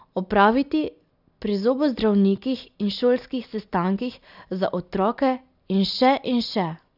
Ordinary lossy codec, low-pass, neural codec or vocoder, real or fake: none; 5.4 kHz; none; real